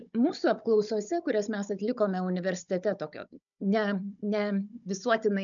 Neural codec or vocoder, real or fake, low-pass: codec, 16 kHz, 16 kbps, FunCodec, trained on Chinese and English, 50 frames a second; fake; 7.2 kHz